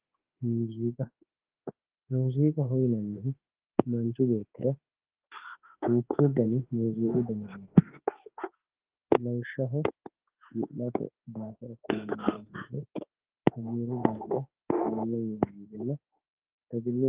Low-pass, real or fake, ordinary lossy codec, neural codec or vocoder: 3.6 kHz; fake; Opus, 16 kbps; autoencoder, 48 kHz, 32 numbers a frame, DAC-VAE, trained on Japanese speech